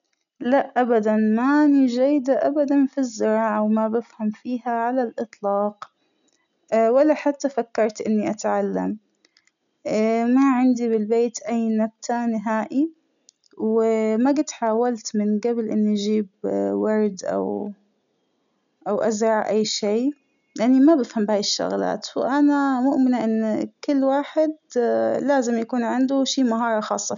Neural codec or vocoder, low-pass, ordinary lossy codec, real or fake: none; 7.2 kHz; none; real